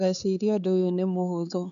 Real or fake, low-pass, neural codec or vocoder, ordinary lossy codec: fake; 7.2 kHz; codec, 16 kHz, 4 kbps, X-Codec, HuBERT features, trained on LibriSpeech; none